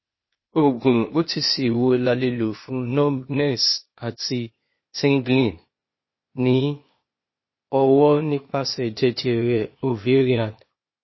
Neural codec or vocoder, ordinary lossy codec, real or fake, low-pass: codec, 16 kHz, 0.8 kbps, ZipCodec; MP3, 24 kbps; fake; 7.2 kHz